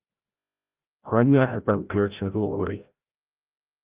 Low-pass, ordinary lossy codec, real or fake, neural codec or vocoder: 3.6 kHz; Opus, 32 kbps; fake; codec, 16 kHz, 0.5 kbps, FreqCodec, larger model